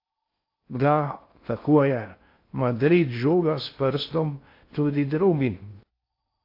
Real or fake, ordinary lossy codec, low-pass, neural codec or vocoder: fake; AAC, 32 kbps; 5.4 kHz; codec, 16 kHz in and 24 kHz out, 0.6 kbps, FocalCodec, streaming, 2048 codes